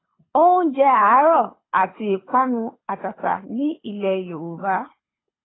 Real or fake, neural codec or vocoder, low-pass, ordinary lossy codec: fake; codec, 24 kHz, 6 kbps, HILCodec; 7.2 kHz; AAC, 16 kbps